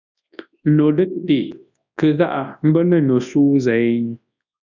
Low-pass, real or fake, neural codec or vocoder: 7.2 kHz; fake; codec, 24 kHz, 0.9 kbps, WavTokenizer, large speech release